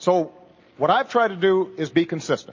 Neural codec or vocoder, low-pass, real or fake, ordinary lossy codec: none; 7.2 kHz; real; MP3, 32 kbps